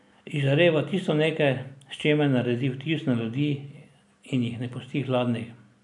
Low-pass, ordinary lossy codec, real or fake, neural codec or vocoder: 10.8 kHz; none; real; none